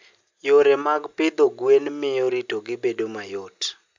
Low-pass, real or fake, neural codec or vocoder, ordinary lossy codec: 7.2 kHz; real; none; MP3, 64 kbps